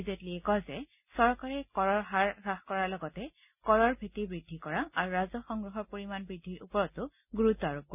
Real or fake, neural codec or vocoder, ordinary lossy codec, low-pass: real; none; none; 3.6 kHz